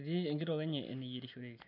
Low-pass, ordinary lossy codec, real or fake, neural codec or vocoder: 5.4 kHz; none; real; none